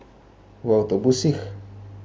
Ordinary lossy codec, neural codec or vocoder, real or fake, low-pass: none; none; real; none